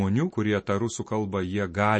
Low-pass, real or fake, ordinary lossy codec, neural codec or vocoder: 9.9 kHz; real; MP3, 32 kbps; none